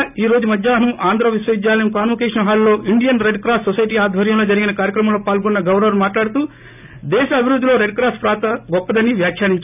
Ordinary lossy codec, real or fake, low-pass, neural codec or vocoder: none; real; 3.6 kHz; none